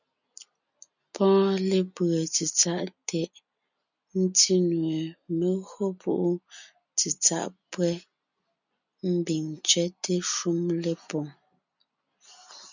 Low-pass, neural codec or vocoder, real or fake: 7.2 kHz; none; real